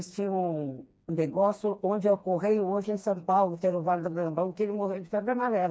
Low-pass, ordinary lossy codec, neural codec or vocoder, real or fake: none; none; codec, 16 kHz, 2 kbps, FreqCodec, smaller model; fake